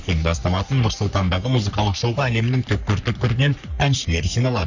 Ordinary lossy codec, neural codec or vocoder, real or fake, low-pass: none; codec, 44.1 kHz, 3.4 kbps, Pupu-Codec; fake; 7.2 kHz